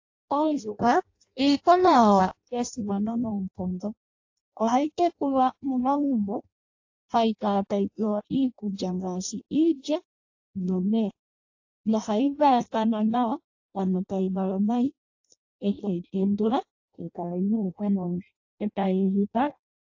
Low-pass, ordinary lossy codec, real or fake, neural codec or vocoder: 7.2 kHz; AAC, 48 kbps; fake; codec, 16 kHz in and 24 kHz out, 0.6 kbps, FireRedTTS-2 codec